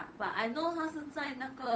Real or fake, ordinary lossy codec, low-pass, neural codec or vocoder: fake; none; none; codec, 16 kHz, 8 kbps, FunCodec, trained on Chinese and English, 25 frames a second